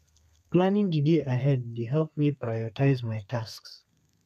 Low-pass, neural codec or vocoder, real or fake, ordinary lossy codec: 14.4 kHz; codec, 32 kHz, 1.9 kbps, SNAC; fake; none